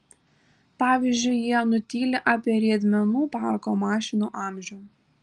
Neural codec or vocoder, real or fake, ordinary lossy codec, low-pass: none; real; Opus, 32 kbps; 10.8 kHz